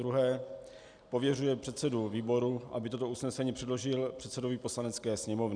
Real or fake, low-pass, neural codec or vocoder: real; 9.9 kHz; none